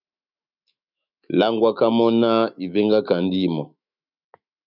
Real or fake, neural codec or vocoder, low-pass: fake; autoencoder, 48 kHz, 128 numbers a frame, DAC-VAE, trained on Japanese speech; 5.4 kHz